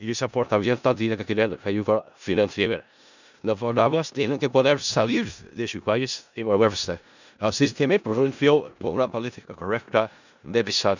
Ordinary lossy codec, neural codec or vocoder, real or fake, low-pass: none; codec, 16 kHz in and 24 kHz out, 0.4 kbps, LongCat-Audio-Codec, four codebook decoder; fake; 7.2 kHz